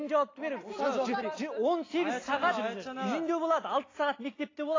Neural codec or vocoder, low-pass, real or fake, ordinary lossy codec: none; 7.2 kHz; real; AAC, 32 kbps